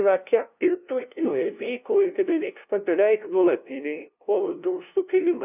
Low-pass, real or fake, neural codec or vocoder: 3.6 kHz; fake; codec, 16 kHz, 0.5 kbps, FunCodec, trained on LibriTTS, 25 frames a second